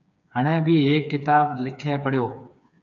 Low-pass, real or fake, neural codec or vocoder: 7.2 kHz; fake; codec, 16 kHz, 4 kbps, FreqCodec, smaller model